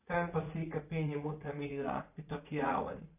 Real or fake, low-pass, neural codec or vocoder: fake; 3.6 kHz; vocoder, 44.1 kHz, 80 mel bands, Vocos